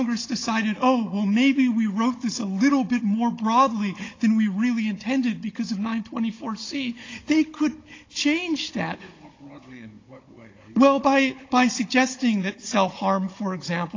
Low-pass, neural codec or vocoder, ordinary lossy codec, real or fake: 7.2 kHz; codec, 16 kHz, 16 kbps, FunCodec, trained on Chinese and English, 50 frames a second; AAC, 32 kbps; fake